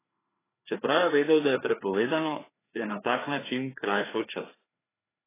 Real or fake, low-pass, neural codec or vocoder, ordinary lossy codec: fake; 3.6 kHz; codec, 16 kHz, 2 kbps, FreqCodec, larger model; AAC, 16 kbps